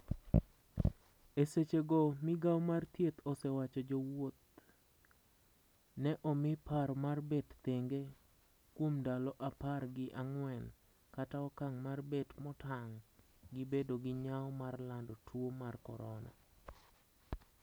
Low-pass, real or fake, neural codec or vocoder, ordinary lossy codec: none; real; none; none